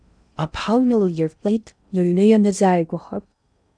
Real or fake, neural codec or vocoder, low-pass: fake; codec, 16 kHz in and 24 kHz out, 0.6 kbps, FocalCodec, streaming, 4096 codes; 9.9 kHz